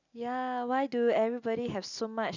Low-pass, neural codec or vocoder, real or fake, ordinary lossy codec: 7.2 kHz; none; real; none